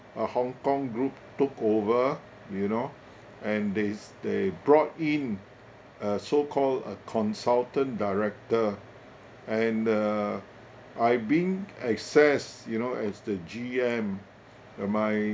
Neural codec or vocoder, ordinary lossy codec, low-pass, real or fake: none; none; none; real